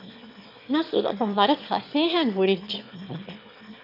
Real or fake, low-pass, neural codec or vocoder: fake; 5.4 kHz; autoencoder, 22.05 kHz, a latent of 192 numbers a frame, VITS, trained on one speaker